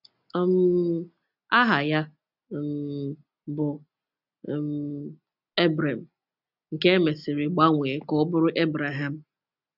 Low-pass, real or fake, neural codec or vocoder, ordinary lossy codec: 5.4 kHz; real; none; none